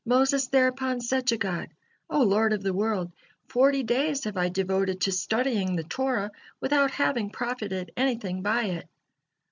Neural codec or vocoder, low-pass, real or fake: none; 7.2 kHz; real